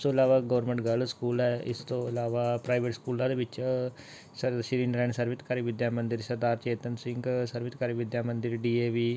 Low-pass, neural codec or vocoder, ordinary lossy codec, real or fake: none; none; none; real